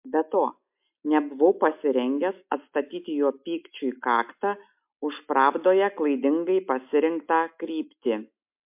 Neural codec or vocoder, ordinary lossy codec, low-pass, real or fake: none; MP3, 32 kbps; 3.6 kHz; real